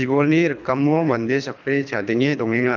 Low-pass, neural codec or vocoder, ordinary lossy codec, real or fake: 7.2 kHz; codec, 24 kHz, 3 kbps, HILCodec; none; fake